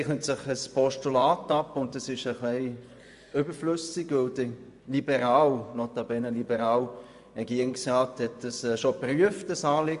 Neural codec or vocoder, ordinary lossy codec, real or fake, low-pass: vocoder, 24 kHz, 100 mel bands, Vocos; none; fake; 10.8 kHz